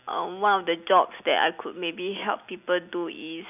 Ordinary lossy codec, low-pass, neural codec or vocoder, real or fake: none; 3.6 kHz; none; real